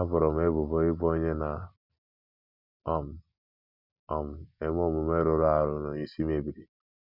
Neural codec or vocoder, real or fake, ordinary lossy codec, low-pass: none; real; none; 5.4 kHz